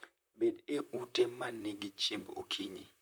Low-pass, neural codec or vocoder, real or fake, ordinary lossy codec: none; vocoder, 44.1 kHz, 128 mel bands, Pupu-Vocoder; fake; none